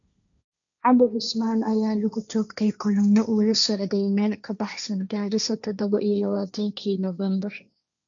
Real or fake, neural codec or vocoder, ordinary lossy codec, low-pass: fake; codec, 16 kHz, 1.1 kbps, Voila-Tokenizer; none; 7.2 kHz